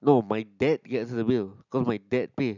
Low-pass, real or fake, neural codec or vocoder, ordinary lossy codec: 7.2 kHz; real; none; none